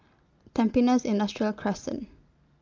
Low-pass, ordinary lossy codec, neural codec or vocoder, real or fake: 7.2 kHz; Opus, 24 kbps; none; real